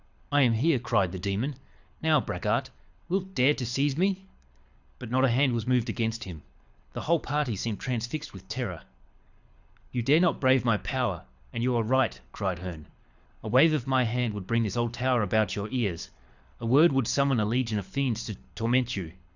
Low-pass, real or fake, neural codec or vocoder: 7.2 kHz; fake; codec, 24 kHz, 6 kbps, HILCodec